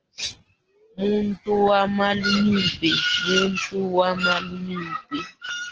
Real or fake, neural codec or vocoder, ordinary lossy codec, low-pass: real; none; Opus, 16 kbps; 7.2 kHz